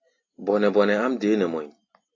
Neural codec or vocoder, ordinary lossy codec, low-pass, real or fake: none; AAC, 32 kbps; 7.2 kHz; real